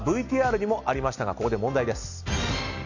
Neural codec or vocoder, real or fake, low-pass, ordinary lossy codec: none; real; 7.2 kHz; none